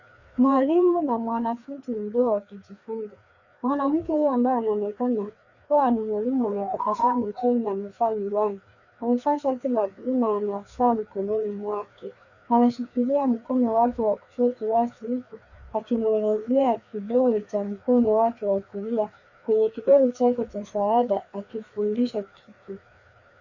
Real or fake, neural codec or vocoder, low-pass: fake; codec, 16 kHz, 2 kbps, FreqCodec, larger model; 7.2 kHz